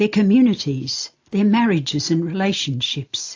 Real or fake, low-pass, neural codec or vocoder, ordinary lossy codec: real; 7.2 kHz; none; AAC, 48 kbps